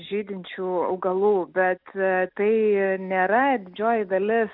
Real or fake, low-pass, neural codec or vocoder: real; 5.4 kHz; none